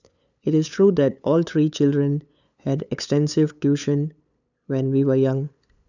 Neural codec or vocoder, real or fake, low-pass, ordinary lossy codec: codec, 16 kHz, 8 kbps, FunCodec, trained on LibriTTS, 25 frames a second; fake; 7.2 kHz; none